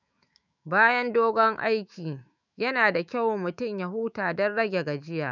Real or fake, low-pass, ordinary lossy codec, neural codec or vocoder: fake; 7.2 kHz; none; autoencoder, 48 kHz, 128 numbers a frame, DAC-VAE, trained on Japanese speech